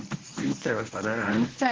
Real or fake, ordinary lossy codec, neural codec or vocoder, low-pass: fake; Opus, 16 kbps; codec, 24 kHz, 0.9 kbps, WavTokenizer, medium speech release version 1; 7.2 kHz